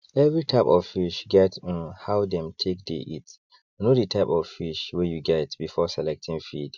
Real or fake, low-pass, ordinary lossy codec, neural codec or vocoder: real; 7.2 kHz; none; none